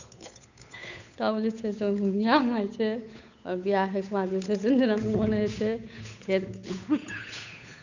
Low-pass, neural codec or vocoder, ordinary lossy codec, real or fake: 7.2 kHz; codec, 16 kHz, 8 kbps, FunCodec, trained on Chinese and English, 25 frames a second; none; fake